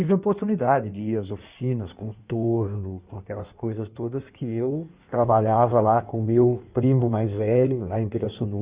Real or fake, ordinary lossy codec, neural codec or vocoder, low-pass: fake; Opus, 64 kbps; codec, 16 kHz in and 24 kHz out, 1.1 kbps, FireRedTTS-2 codec; 3.6 kHz